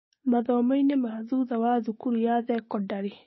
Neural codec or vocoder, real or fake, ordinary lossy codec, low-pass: codec, 16 kHz, 6 kbps, DAC; fake; MP3, 24 kbps; 7.2 kHz